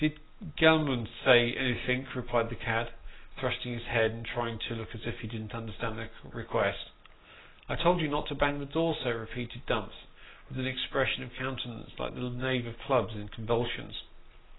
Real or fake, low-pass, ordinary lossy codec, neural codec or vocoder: real; 7.2 kHz; AAC, 16 kbps; none